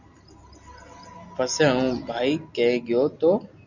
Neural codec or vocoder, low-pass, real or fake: none; 7.2 kHz; real